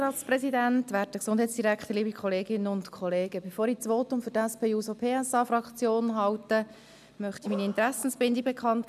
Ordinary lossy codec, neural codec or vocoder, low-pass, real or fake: none; none; 14.4 kHz; real